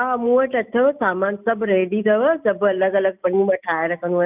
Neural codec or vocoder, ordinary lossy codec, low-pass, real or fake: none; none; 3.6 kHz; real